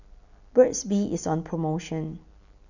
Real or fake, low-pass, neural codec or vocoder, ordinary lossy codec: real; 7.2 kHz; none; none